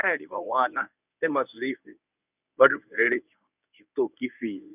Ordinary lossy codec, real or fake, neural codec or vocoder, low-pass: none; fake; codec, 24 kHz, 0.9 kbps, WavTokenizer, medium speech release version 1; 3.6 kHz